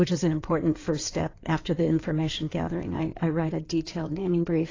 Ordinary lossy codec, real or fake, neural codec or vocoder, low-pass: AAC, 32 kbps; fake; codec, 16 kHz in and 24 kHz out, 2.2 kbps, FireRedTTS-2 codec; 7.2 kHz